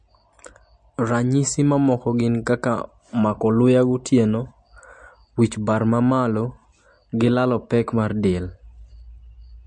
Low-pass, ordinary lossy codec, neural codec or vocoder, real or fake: 9.9 kHz; MP3, 48 kbps; none; real